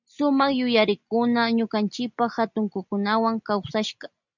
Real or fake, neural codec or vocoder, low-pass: real; none; 7.2 kHz